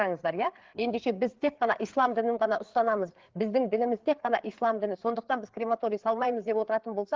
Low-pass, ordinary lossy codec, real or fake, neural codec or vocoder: 7.2 kHz; Opus, 16 kbps; fake; codec, 16 kHz, 4 kbps, FreqCodec, larger model